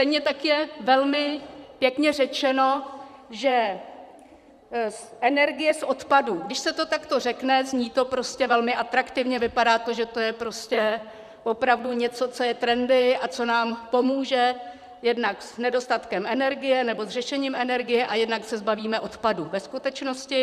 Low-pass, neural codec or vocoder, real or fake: 14.4 kHz; vocoder, 44.1 kHz, 128 mel bands, Pupu-Vocoder; fake